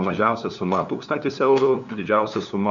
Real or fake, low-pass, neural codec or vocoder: fake; 7.2 kHz; codec, 16 kHz, 2 kbps, FunCodec, trained on LibriTTS, 25 frames a second